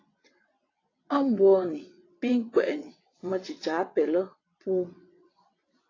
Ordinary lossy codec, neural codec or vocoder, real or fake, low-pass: AAC, 32 kbps; vocoder, 22.05 kHz, 80 mel bands, WaveNeXt; fake; 7.2 kHz